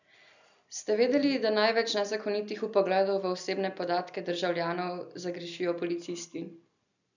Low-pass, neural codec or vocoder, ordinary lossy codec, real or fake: 7.2 kHz; none; none; real